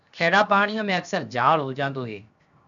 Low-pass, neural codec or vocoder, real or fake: 7.2 kHz; codec, 16 kHz, 0.7 kbps, FocalCodec; fake